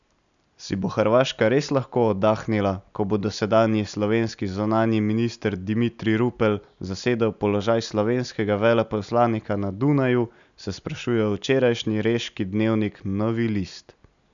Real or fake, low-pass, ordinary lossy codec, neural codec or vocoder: real; 7.2 kHz; none; none